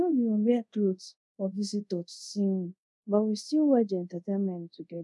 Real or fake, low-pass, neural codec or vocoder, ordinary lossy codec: fake; none; codec, 24 kHz, 0.5 kbps, DualCodec; none